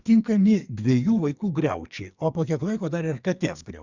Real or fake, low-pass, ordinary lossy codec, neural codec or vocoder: fake; 7.2 kHz; Opus, 64 kbps; codec, 44.1 kHz, 2.6 kbps, SNAC